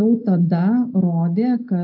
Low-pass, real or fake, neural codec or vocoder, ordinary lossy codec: 5.4 kHz; real; none; AAC, 48 kbps